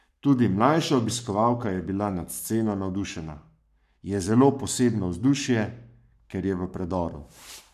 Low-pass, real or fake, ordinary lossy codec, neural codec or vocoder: 14.4 kHz; fake; none; codec, 44.1 kHz, 7.8 kbps, Pupu-Codec